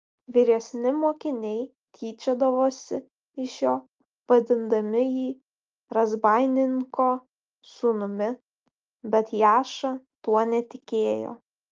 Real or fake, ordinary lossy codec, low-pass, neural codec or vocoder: real; Opus, 24 kbps; 7.2 kHz; none